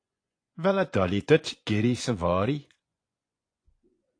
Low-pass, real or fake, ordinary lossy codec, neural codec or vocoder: 9.9 kHz; fake; AAC, 48 kbps; vocoder, 24 kHz, 100 mel bands, Vocos